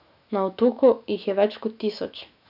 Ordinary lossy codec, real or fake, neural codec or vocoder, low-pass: none; fake; autoencoder, 48 kHz, 128 numbers a frame, DAC-VAE, trained on Japanese speech; 5.4 kHz